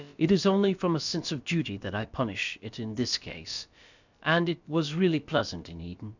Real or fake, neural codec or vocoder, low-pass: fake; codec, 16 kHz, about 1 kbps, DyCAST, with the encoder's durations; 7.2 kHz